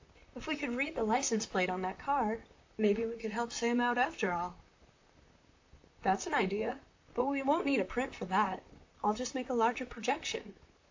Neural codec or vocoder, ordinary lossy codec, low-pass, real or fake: vocoder, 44.1 kHz, 128 mel bands, Pupu-Vocoder; AAC, 48 kbps; 7.2 kHz; fake